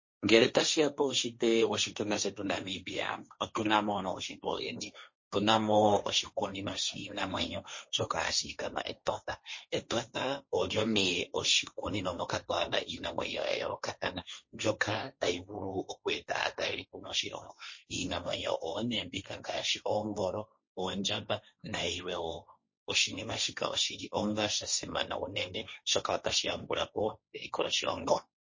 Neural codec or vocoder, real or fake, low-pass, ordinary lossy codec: codec, 16 kHz, 1.1 kbps, Voila-Tokenizer; fake; 7.2 kHz; MP3, 32 kbps